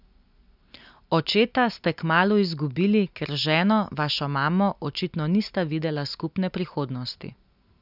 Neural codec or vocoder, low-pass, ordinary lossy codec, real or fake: none; 5.4 kHz; none; real